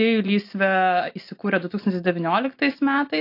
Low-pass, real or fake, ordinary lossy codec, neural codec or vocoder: 5.4 kHz; real; AAC, 32 kbps; none